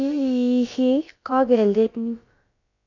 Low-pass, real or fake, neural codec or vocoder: 7.2 kHz; fake; codec, 16 kHz, about 1 kbps, DyCAST, with the encoder's durations